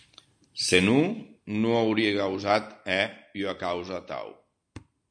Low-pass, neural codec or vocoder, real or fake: 9.9 kHz; none; real